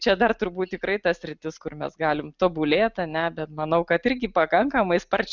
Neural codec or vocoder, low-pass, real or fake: none; 7.2 kHz; real